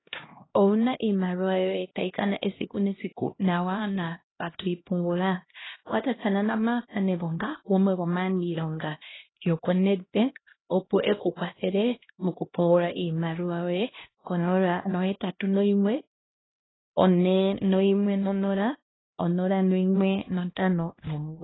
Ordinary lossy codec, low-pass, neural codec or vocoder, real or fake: AAC, 16 kbps; 7.2 kHz; codec, 16 kHz, 1 kbps, X-Codec, HuBERT features, trained on LibriSpeech; fake